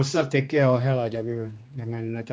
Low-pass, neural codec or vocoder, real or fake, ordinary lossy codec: none; codec, 16 kHz, 2 kbps, X-Codec, HuBERT features, trained on general audio; fake; none